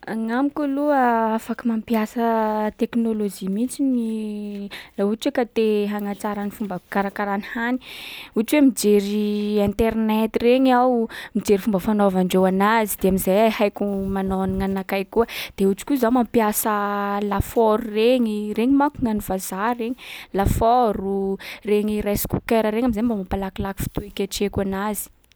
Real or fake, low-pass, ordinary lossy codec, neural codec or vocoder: real; none; none; none